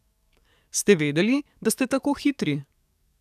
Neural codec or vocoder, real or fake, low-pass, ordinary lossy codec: codec, 44.1 kHz, 7.8 kbps, DAC; fake; 14.4 kHz; none